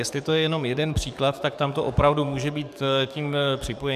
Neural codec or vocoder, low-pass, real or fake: codec, 44.1 kHz, 7.8 kbps, DAC; 14.4 kHz; fake